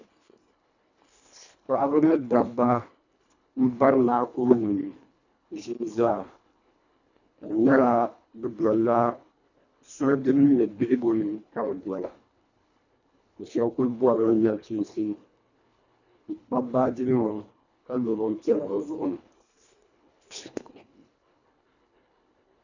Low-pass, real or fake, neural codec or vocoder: 7.2 kHz; fake; codec, 24 kHz, 1.5 kbps, HILCodec